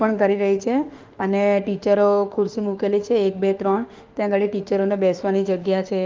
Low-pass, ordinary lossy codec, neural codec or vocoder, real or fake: 7.2 kHz; Opus, 32 kbps; autoencoder, 48 kHz, 32 numbers a frame, DAC-VAE, trained on Japanese speech; fake